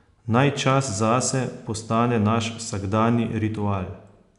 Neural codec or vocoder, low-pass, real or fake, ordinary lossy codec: none; 10.8 kHz; real; none